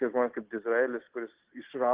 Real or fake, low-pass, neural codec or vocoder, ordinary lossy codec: real; 3.6 kHz; none; Opus, 16 kbps